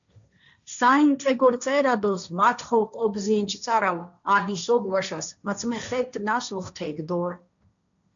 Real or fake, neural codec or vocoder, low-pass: fake; codec, 16 kHz, 1.1 kbps, Voila-Tokenizer; 7.2 kHz